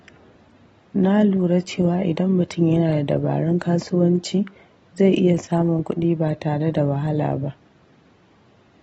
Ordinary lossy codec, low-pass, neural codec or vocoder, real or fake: AAC, 24 kbps; 14.4 kHz; none; real